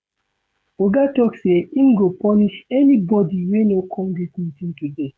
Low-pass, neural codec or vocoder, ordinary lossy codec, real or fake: none; codec, 16 kHz, 16 kbps, FreqCodec, smaller model; none; fake